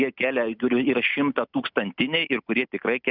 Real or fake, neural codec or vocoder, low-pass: real; none; 5.4 kHz